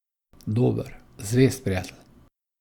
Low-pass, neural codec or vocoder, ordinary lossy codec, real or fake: 19.8 kHz; none; Opus, 64 kbps; real